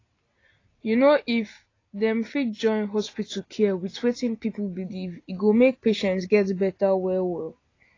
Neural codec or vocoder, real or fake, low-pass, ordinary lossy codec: none; real; 7.2 kHz; AAC, 32 kbps